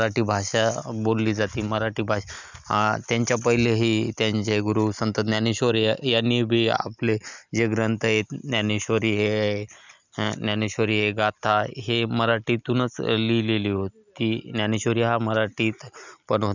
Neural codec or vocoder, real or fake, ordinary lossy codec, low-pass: none; real; none; 7.2 kHz